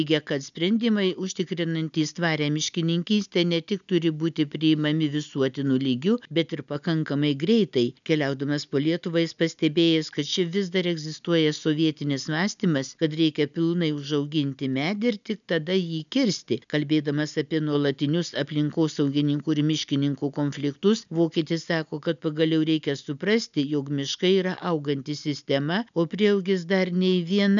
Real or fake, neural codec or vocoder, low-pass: real; none; 7.2 kHz